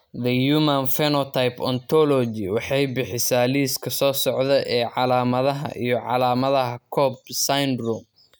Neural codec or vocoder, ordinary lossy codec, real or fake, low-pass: none; none; real; none